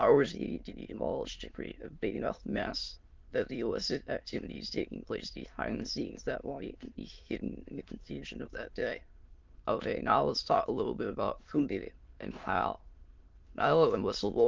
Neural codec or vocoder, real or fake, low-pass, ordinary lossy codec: autoencoder, 22.05 kHz, a latent of 192 numbers a frame, VITS, trained on many speakers; fake; 7.2 kHz; Opus, 32 kbps